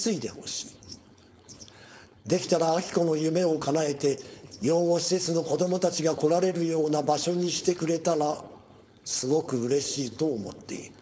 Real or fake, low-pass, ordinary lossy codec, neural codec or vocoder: fake; none; none; codec, 16 kHz, 4.8 kbps, FACodec